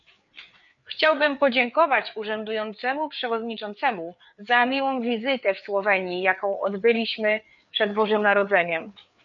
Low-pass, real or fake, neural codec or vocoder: 7.2 kHz; fake; codec, 16 kHz, 4 kbps, FreqCodec, larger model